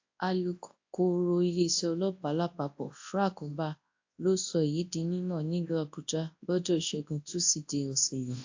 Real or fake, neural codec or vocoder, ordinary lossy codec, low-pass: fake; codec, 24 kHz, 0.9 kbps, WavTokenizer, large speech release; AAC, 48 kbps; 7.2 kHz